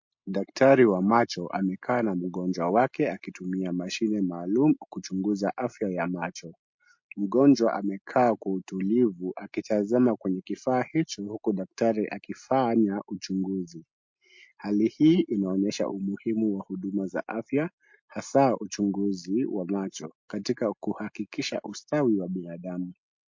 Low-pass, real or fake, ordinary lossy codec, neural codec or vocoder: 7.2 kHz; real; MP3, 48 kbps; none